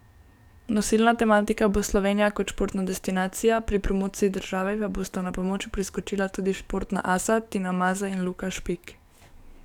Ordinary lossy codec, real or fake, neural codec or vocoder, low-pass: none; fake; codec, 44.1 kHz, 7.8 kbps, DAC; 19.8 kHz